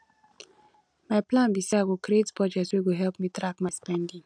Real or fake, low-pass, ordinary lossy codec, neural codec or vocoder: real; 9.9 kHz; none; none